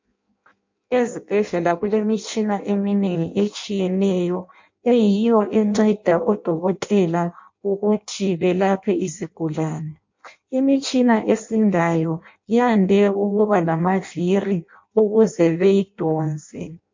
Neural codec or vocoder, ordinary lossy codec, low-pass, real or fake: codec, 16 kHz in and 24 kHz out, 0.6 kbps, FireRedTTS-2 codec; MP3, 48 kbps; 7.2 kHz; fake